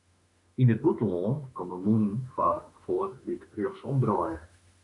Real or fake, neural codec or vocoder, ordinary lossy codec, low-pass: fake; autoencoder, 48 kHz, 32 numbers a frame, DAC-VAE, trained on Japanese speech; MP3, 96 kbps; 10.8 kHz